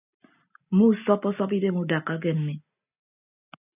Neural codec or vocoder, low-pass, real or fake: none; 3.6 kHz; real